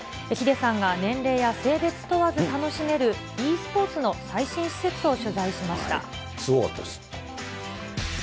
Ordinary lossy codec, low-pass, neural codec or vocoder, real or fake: none; none; none; real